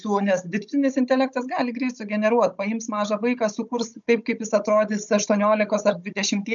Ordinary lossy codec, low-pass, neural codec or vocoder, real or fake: MP3, 96 kbps; 7.2 kHz; codec, 16 kHz, 16 kbps, FunCodec, trained on Chinese and English, 50 frames a second; fake